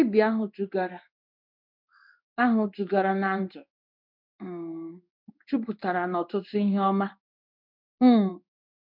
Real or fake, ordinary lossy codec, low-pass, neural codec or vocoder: fake; none; 5.4 kHz; codec, 16 kHz in and 24 kHz out, 1 kbps, XY-Tokenizer